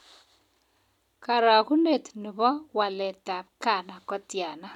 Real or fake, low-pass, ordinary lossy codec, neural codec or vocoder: real; 19.8 kHz; none; none